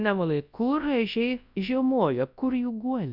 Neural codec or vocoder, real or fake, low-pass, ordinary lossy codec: codec, 24 kHz, 0.9 kbps, WavTokenizer, large speech release; fake; 5.4 kHz; Opus, 64 kbps